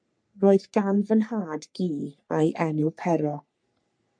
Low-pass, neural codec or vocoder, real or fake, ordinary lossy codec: 9.9 kHz; codec, 44.1 kHz, 3.4 kbps, Pupu-Codec; fake; MP3, 64 kbps